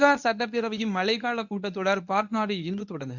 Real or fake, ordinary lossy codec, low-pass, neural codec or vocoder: fake; none; 7.2 kHz; codec, 24 kHz, 0.9 kbps, WavTokenizer, medium speech release version 1